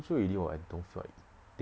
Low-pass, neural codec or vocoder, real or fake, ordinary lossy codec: none; none; real; none